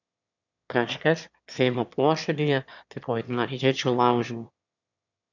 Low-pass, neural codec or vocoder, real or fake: 7.2 kHz; autoencoder, 22.05 kHz, a latent of 192 numbers a frame, VITS, trained on one speaker; fake